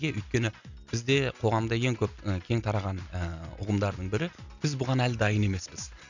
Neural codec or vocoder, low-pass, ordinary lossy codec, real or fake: none; 7.2 kHz; none; real